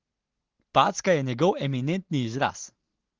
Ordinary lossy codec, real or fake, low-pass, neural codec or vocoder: Opus, 16 kbps; real; 7.2 kHz; none